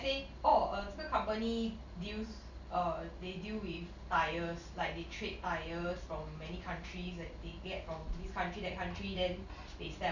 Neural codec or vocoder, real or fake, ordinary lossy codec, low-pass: none; real; none; 7.2 kHz